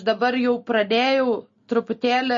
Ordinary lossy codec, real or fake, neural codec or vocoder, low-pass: MP3, 32 kbps; real; none; 7.2 kHz